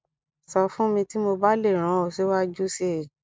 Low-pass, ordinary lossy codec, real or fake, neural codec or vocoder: none; none; real; none